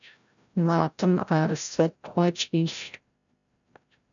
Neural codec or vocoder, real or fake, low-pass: codec, 16 kHz, 0.5 kbps, FreqCodec, larger model; fake; 7.2 kHz